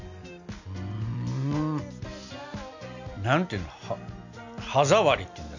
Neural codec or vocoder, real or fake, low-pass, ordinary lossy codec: none; real; 7.2 kHz; none